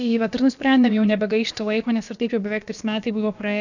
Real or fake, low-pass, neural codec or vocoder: fake; 7.2 kHz; codec, 16 kHz, about 1 kbps, DyCAST, with the encoder's durations